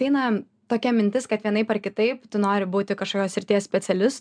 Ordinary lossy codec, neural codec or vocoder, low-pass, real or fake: MP3, 96 kbps; none; 9.9 kHz; real